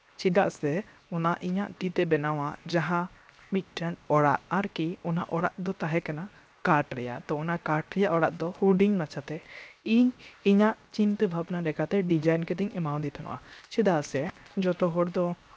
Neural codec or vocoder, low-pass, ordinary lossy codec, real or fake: codec, 16 kHz, 0.7 kbps, FocalCodec; none; none; fake